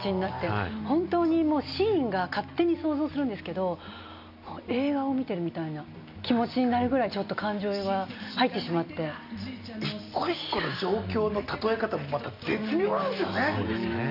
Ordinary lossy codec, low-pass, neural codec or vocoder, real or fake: none; 5.4 kHz; none; real